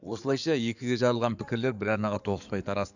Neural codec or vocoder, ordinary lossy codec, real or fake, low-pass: codec, 16 kHz in and 24 kHz out, 2.2 kbps, FireRedTTS-2 codec; none; fake; 7.2 kHz